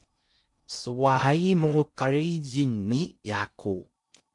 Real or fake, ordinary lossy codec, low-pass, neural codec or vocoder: fake; MP3, 64 kbps; 10.8 kHz; codec, 16 kHz in and 24 kHz out, 0.6 kbps, FocalCodec, streaming, 2048 codes